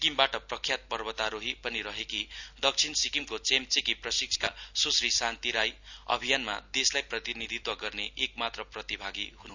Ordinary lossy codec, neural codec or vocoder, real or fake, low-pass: none; none; real; 7.2 kHz